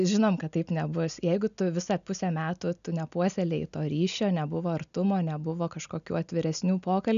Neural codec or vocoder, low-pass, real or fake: none; 7.2 kHz; real